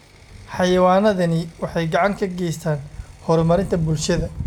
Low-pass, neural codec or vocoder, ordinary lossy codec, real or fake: 19.8 kHz; none; none; real